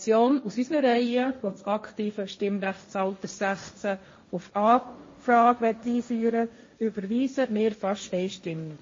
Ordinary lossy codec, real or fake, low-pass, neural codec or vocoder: MP3, 32 kbps; fake; 7.2 kHz; codec, 16 kHz, 1.1 kbps, Voila-Tokenizer